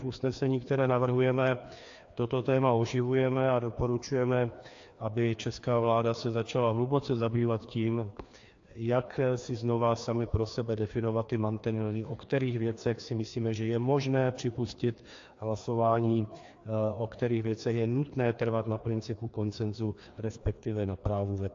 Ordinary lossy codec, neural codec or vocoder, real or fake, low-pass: AAC, 48 kbps; codec, 16 kHz, 2 kbps, FreqCodec, larger model; fake; 7.2 kHz